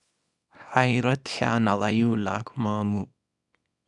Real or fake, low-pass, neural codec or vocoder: fake; 10.8 kHz; codec, 24 kHz, 0.9 kbps, WavTokenizer, small release